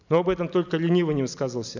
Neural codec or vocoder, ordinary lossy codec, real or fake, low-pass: none; none; real; 7.2 kHz